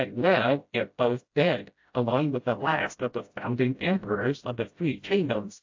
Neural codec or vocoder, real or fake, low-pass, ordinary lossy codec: codec, 16 kHz, 0.5 kbps, FreqCodec, smaller model; fake; 7.2 kHz; AAC, 48 kbps